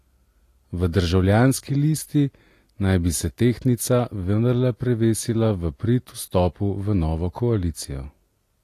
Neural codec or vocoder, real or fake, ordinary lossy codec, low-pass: none; real; AAC, 48 kbps; 14.4 kHz